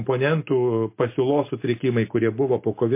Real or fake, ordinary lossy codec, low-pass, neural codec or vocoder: real; MP3, 24 kbps; 3.6 kHz; none